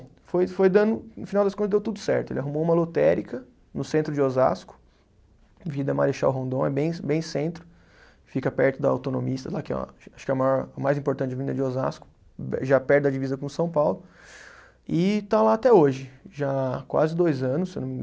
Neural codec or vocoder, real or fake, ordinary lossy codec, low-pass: none; real; none; none